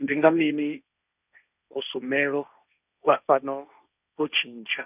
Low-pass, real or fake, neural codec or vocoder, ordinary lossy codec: 3.6 kHz; fake; codec, 16 kHz, 1.1 kbps, Voila-Tokenizer; none